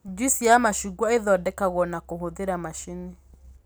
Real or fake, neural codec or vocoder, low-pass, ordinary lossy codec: real; none; none; none